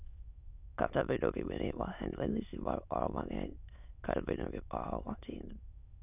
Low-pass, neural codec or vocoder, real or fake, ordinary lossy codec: 3.6 kHz; autoencoder, 22.05 kHz, a latent of 192 numbers a frame, VITS, trained on many speakers; fake; none